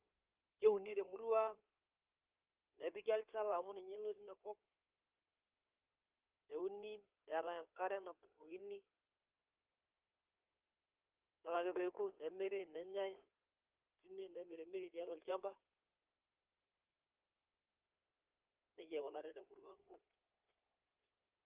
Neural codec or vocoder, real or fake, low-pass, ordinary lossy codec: codec, 16 kHz in and 24 kHz out, 2.2 kbps, FireRedTTS-2 codec; fake; 3.6 kHz; Opus, 24 kbps